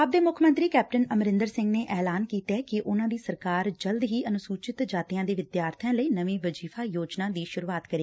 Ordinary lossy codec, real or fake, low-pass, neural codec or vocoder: none; real; none; none